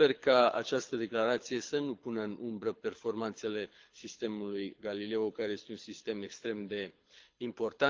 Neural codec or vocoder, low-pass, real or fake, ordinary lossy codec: codec, 24 kHz, 6 kbps, HILCodec; 7.2 kHz; fake; Opus, 32 kbps